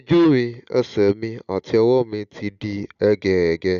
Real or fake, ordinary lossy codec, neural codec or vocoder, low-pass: real; none; none; 7.2 kHz